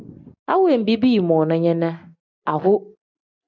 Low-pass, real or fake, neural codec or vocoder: 7.2 kHz; real; none